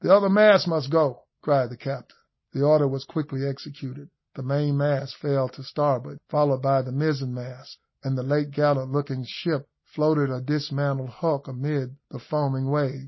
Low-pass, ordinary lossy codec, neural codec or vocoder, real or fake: 7.2 kHz; MP3, 24 kbps; none; real